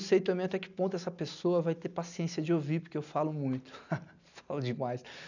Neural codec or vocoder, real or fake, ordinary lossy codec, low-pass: none; real; none; 7.2 kHz